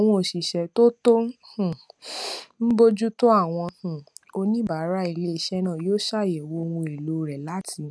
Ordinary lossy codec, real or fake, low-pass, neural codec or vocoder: none; real; none; none